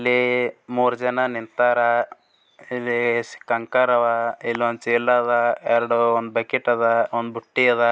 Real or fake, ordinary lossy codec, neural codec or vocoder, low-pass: real; none; none; none